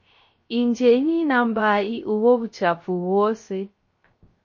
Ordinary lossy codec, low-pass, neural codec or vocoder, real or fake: MP3, 32 kbps; 7.2 kHz; codec, 16 kHz, 0.3 kbps, FocalCodec; fake